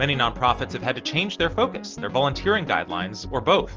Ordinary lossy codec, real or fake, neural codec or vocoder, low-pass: Opus, 24 kbps; real; none; 7.2 kHz